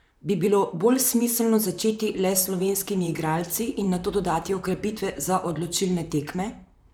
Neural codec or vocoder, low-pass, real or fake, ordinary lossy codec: vocoder, 44.1 kHz, 128 mel bands, Pupu-Vocoder; none; fake; none